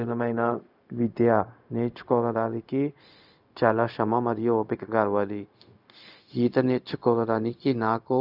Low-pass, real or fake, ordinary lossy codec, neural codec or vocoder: 5.4 kHz; fake; none; codec, 16 kHz, 0.4 kbps, LongCat-Audio-Codec